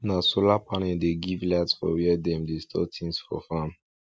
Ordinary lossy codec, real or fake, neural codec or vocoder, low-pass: none; real; none; none